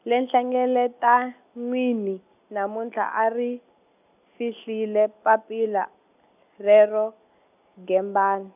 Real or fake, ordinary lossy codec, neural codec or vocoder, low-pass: real; none; none; 3.6 kHz